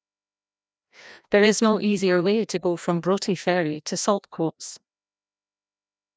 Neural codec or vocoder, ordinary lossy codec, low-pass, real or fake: codec, 16 kHz, 1 kbps, FreqCodec, larger model; none; none; fake